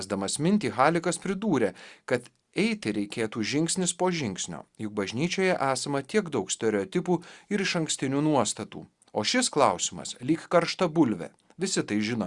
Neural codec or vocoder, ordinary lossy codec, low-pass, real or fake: none; Opus, 64 kbps; 10.8 kHz; real